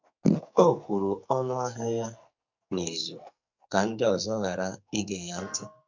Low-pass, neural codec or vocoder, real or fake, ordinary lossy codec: 7.2 kHz; codec, 44.1 kHz, 2.6 kbps, SNAC; fake; MP3, 64 kbps